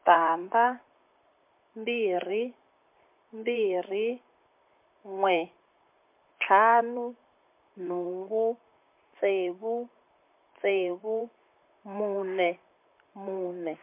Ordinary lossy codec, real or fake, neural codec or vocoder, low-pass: MP3, 32 kbps; fake; vocoder, 44.1 kHz, 128 mel bands, Pupu-Vocoder; 3.6 kHz